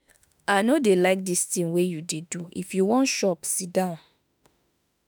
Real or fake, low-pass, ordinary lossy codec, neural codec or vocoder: fake; none; none; autoencoder, 48 kHz, 32 numbers a frame, DAC-VAE, trained on Japanese speech